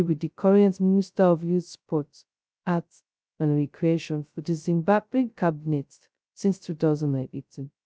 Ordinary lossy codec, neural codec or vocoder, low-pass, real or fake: none; codec, 16 kHz, 0.2 kbps, FocalCodec; none; fake